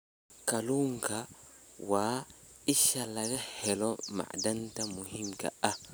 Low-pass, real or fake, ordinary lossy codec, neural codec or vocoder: none; real; none; none